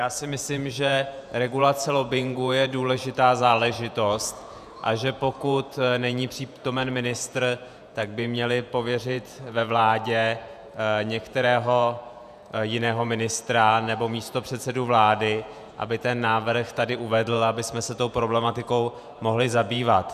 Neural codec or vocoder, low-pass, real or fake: vocoder, 48 kHz, 128 mel bands, Vocos; 14.4 kHz; fake